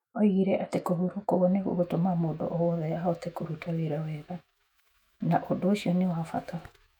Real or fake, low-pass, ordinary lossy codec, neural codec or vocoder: fake; 19.8 kHz; none; autoencoder, 48 kHz, 128 numbers a frame, DAC-VAE, trained on Japanese speech